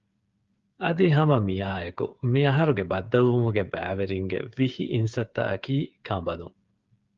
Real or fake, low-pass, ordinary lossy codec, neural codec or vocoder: fake; 7.2 kHz; Opus, 32 kbps; codec, 16 kHz, 8 kbps, FreqCodec, smaller model